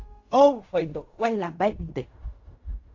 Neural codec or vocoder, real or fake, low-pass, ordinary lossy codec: codec, 16 kHz in and 24 kHz out, 0.4 kbps, LongCat-Audio-Codec, fine tuned four codebook decoder; fake; 7.2 kHz; AAC, 48 kbps